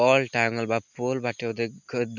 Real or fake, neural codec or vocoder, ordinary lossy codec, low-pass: real; none; none; 7.2 kHz